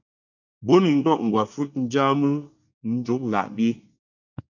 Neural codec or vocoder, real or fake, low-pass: codec, 24 kHz, 1 kbps, SNAC; fake; 7.2 kHz